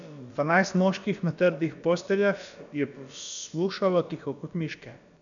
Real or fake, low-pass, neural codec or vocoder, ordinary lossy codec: fake; 7.2 kHz; codec, 16 kHz, about 1 kbps, DyCAST, with the encoder's durations; none